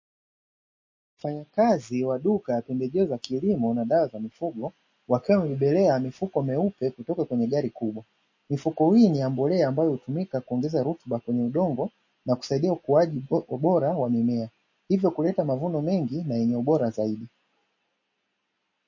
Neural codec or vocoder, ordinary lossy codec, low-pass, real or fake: none; MP3, 32 kbps; 7.2 kHz; real